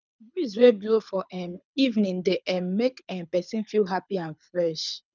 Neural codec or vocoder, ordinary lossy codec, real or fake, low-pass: codec, 24 kHz, 6 kbps, HILCodec; none; fake; 7.2 kHz